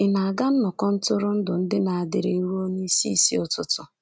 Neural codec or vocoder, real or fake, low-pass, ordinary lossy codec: none; real; none; none